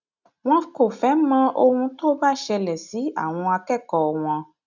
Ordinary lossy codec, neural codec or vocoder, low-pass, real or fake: none; none; 7.2 kHz; real